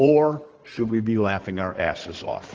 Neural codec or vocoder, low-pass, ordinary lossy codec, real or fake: codec, 16 kHz in and 24 kHz out, 2.2 kbps, FireRedTTS-2 codec; 7.2 kHz; Opus, 16 kbps; fake